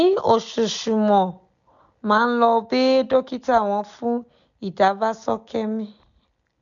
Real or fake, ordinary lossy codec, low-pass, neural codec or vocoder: real; none; 7.2 kHz; none